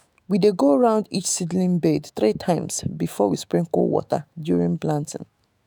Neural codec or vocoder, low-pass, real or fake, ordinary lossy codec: autoencoder, 48 kHz, 128 numbers a frame, DAC-VAE, trained on Japanese speech; none; fake; none